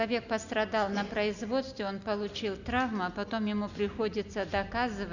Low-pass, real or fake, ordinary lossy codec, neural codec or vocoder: 7.2 kHz; real; none; none